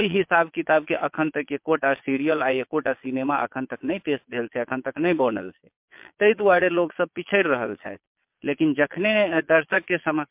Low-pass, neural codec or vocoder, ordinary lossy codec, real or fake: 3.6 kHz; vocoder, 22.05 kHz, 80 mel bands, Vocos; MP3, 32 kbps; fake